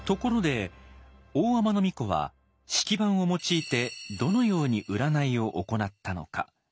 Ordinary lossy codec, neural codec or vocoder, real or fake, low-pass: none; none; real; none